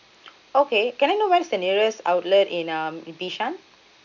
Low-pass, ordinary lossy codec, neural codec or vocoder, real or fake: 7.2 kHz; none; none; real